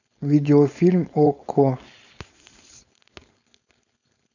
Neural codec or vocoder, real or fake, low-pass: codec, 16 kHz, 4.8 kbps, FACodec; fake; 7.2 kHz